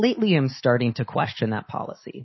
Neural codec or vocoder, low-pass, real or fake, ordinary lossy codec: autoencoder, 48 kHz, 128 numbers a frame, DAC-VAE, trained on Japanese speech; 7.2 kHz; fake; MP3, 24 kbps